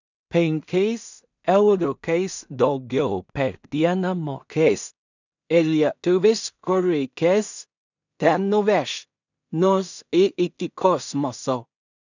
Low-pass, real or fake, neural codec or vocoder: 7.2 kHz; fake; codec, 16 kHz in and 24 kHz out, 0.4 kbps, LongCat-Audio-Codec, two codebook decoder